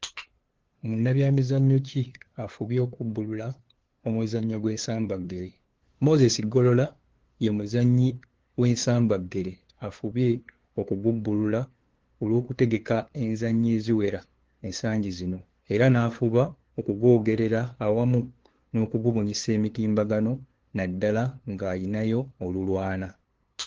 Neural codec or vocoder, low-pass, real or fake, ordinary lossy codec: codec, 16 kHz, 2 kbps, FunCodec, trained on LibriTTS, 25 frames a second; 7.2 kHz; fake; Opus, 16 kbps